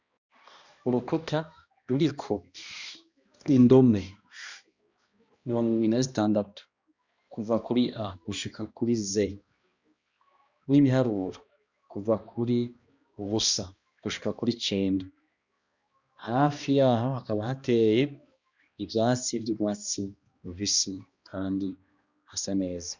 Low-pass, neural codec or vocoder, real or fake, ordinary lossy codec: 7.2 kHz; codec, 16 kHz, 1 kbps, X-Codec, HuBERT features, trained on balanced general audio; fake; Opus, 64 kbps